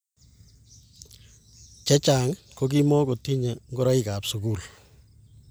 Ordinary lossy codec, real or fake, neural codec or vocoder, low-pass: none; real; none; none